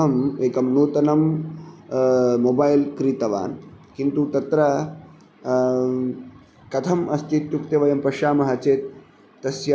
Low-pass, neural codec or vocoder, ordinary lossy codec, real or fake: none; none; none; real